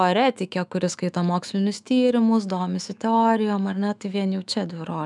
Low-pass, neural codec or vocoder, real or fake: 10.8 kHz; autoencoder, 48 kHz, 128 numbers a frame, DAC-VAE, trained on Japanese speech; fake